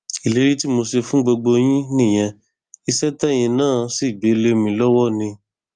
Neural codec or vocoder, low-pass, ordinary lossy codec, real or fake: none; 9.9 kHz; Opus, 32 kbps; real